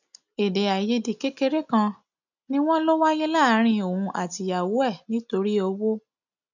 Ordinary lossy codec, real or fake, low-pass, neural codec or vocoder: none; real; 7.2 kHz; none